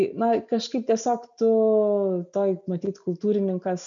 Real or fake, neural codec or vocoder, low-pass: real; none; 7.2 kHz